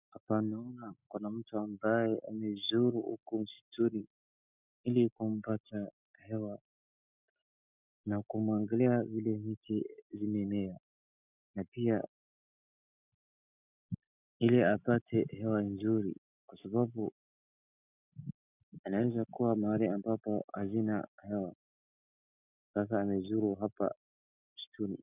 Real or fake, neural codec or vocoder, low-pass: real; none; 3.6 kHz